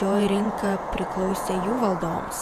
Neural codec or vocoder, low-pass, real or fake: vocoder, 48 kHz, 128 mel bands, Vocos; 14.4 kHz; fake